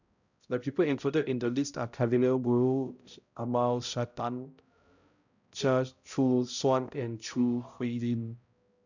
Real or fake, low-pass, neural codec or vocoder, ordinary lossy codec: fake; 7.2 kHz; codec, 16 kHz, 0.5 kbps, X-Codec, HuBERT features, trained on balanced general audio; none